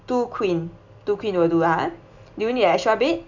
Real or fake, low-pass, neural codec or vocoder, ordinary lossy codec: real; 7.2 kHz; none; none